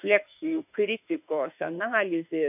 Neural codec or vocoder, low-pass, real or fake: autoencoder, 48 kHz, 32 numbers a frame, DAC-VAE, trained on Japanese speech; 3.6 kHz; fake